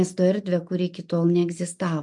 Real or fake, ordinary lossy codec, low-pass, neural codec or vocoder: real; MP3, 64 kbps; 10.8 kHz; none